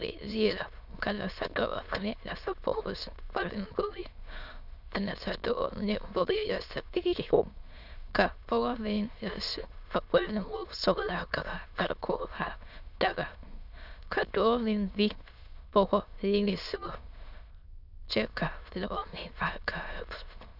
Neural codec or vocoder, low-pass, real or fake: autoencoder, 22.05 kHz, a latent of 192 numbers a frame, VITS, trained on many speakers; 5.4 kHz; fake